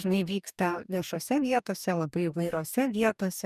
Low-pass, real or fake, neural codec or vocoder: 14.4 kHz; fake; codec, 44.1 kHz, 2.6 kbps, DAC